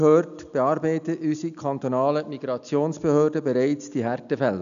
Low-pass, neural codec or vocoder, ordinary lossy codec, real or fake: 7.2 kHz; none; none; real